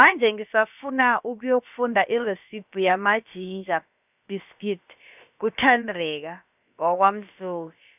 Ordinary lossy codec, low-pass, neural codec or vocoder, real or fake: none; 3.6 kHz; codec, 16 kHz, about 1 kbps, DyCAST, with the encoder's durations; fake